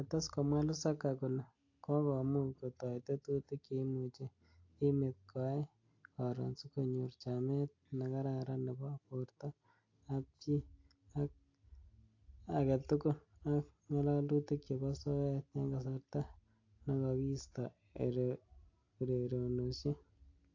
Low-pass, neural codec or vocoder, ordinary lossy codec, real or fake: 7.2 kHz; none; AAC, 48 kbps; real